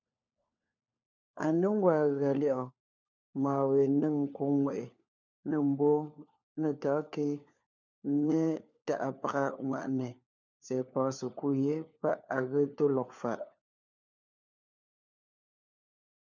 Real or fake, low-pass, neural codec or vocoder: fake; 7.2 kHz; codec, 16 kHz, 4 kbps, FunCodec, trained on LibriTTS, 50 frames a second